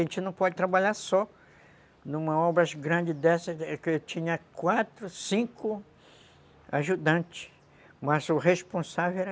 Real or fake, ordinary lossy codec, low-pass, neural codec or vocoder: real; none; none; none